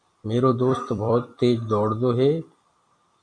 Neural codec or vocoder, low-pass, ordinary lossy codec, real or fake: vocoder, 44.1 kHz, 128 mel bands every 256 samples, BigVGAN v2; 9.9 kHz; MP3, 64 kbps; fake